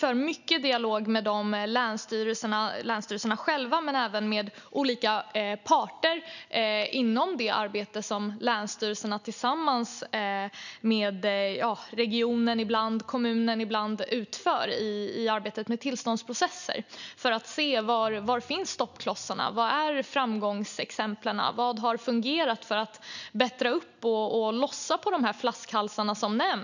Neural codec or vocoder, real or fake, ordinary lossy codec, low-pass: none; real; none; 7.2 kHz